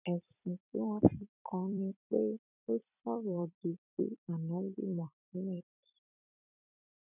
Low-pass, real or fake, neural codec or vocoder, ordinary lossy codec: 3.6 kHz; fake; vocoder, 44.1 kHz, 128 mel bands every 256 samples, BigVGAN v2; none